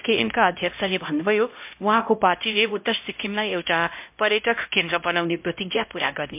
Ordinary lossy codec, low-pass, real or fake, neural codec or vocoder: MP3, 32 kbps; 3.6 kHz; fake; codec, 16 kHz, 0.5 kbps, X-Codec, HuBERT features, trained on LibriSpeech